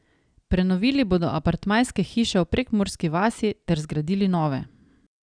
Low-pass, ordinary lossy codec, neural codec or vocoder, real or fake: 9.9 kHz; none; none; real